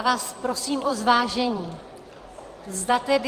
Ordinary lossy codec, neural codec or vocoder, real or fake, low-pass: Opus, 32 kbps; vocoder, 44.1 kHz, 128 mel bands every 512 samples, BigVGAN v2; fake; 14.4 kHz